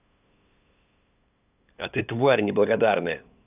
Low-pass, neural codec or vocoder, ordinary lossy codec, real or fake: 3.6 kHz; codec, 16 kHz, 8 kbps, FunCodec, trained on LibriTTS, 25 frames a second; none; fake